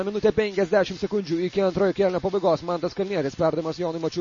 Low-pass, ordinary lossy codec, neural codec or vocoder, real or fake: 7.2 kHz; MP3, 32 kbps; none; real